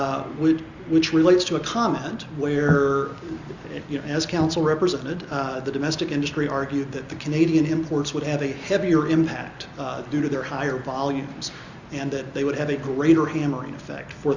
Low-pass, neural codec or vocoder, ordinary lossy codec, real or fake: 7.2 kHz; none; Opus, 64 kbps; real